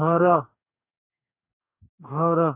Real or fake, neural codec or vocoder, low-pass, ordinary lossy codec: fake; codec, 44.1 kHz, 2.6 kbps, DAC; 3.6 kHz; none